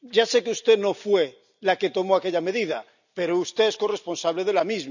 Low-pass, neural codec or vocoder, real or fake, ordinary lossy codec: 7.2 kHz; none; real; none